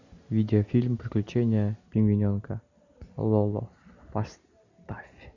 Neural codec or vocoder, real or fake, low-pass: none; real; 7.2 kHz